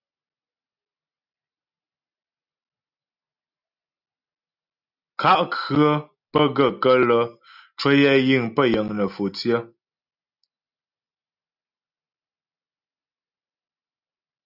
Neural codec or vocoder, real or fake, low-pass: none; real; 5.4 kHz